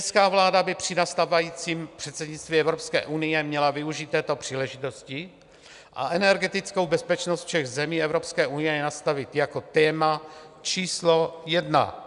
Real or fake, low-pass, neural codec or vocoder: real; 10.8 kHz; none